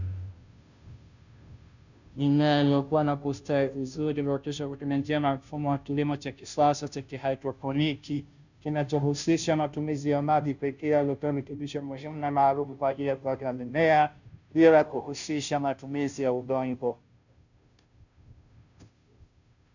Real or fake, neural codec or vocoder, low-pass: fake; codec, 16 kHz, 0.5 kbps, FunCodec, trained on Chinese and English, 25 frames a second; 7.2 kHz